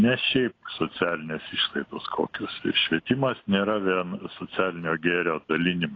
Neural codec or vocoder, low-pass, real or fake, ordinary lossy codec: none; 7.2 kHz; real; AAC, 32 kbps